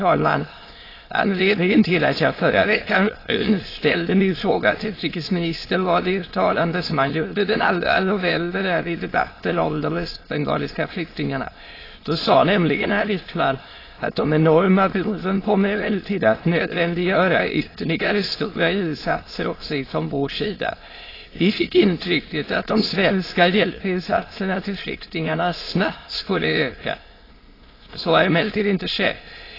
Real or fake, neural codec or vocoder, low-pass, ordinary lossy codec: fake; autoencoder, 22.05 kHz, a latent of 192 numbers a frame, VITS, trained on many speakers; 5.4 kHz; AAC, 24 kbps